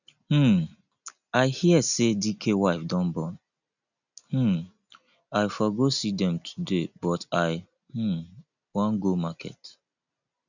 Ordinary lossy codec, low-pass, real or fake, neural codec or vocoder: none; 7.2 kHz; real; none